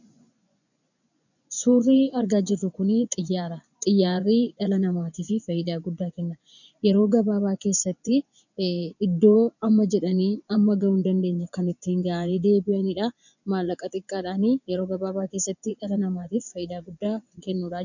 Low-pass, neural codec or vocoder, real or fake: 7.2 kHz; vocoder, 24 kHz, 100 mel bands, Vocos; fake